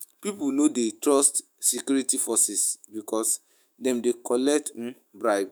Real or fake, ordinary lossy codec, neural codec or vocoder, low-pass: fake; none; autoencoder, 48 kHz, 128 numbers a frame, DAC-VAE, trained on Japanese speech; none